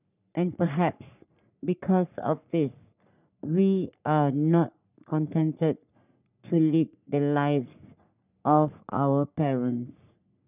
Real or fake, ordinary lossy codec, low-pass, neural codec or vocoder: fake; none; 3.6 kHz; codec, 44.1 kHz, 3.4 kbps, Pupu-Codec